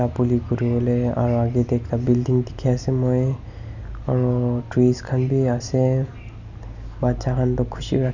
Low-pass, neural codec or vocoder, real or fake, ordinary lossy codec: 7.2 kHz; none; real; none